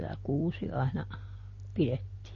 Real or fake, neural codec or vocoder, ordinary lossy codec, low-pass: real; none; MP3, 32 kbps; 7.2 kHz